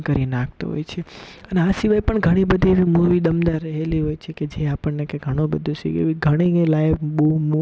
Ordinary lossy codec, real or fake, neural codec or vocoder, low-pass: none; real; none; none